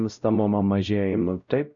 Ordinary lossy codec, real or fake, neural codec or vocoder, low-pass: Opus, 64 kbps; fake; codec, 16 kHz, 0.5 kbps, X-Codec, WavLM features, trained on Multilingual LibriSpeech; 7.2 kHz